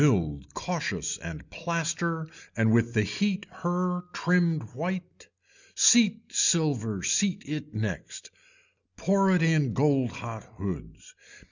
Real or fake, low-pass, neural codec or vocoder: real; 7.2 kHz; none